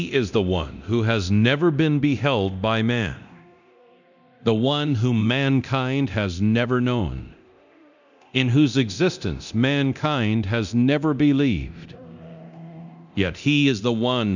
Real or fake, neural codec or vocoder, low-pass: fake; codec, 24 kHz, 0.9 kbps, DualCodec; 7.2 kHz